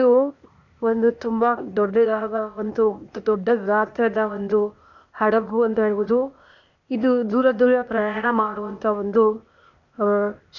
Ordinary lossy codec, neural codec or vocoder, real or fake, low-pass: none; codec, 16 kHz, 0.8 kbps, ZipCodec; fake; 7.2 kHz